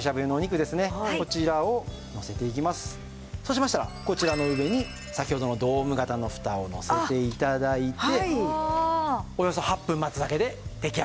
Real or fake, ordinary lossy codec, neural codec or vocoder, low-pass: real; none; none; none